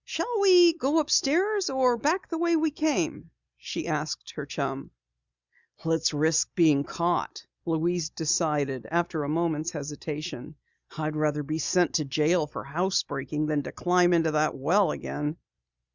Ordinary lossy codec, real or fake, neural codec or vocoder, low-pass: Opus, 64 kbps; real; none; 7.2 kHz